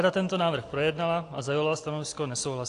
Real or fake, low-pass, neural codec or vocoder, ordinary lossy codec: real; 10.8 kHz; none; AAC, 48 kbps